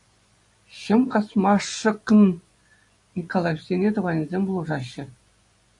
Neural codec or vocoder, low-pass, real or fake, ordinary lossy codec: vocoder, 24 kHz, 100 mel bands, Vocos; 10.8 kHz; fake; AAC, 64 kbps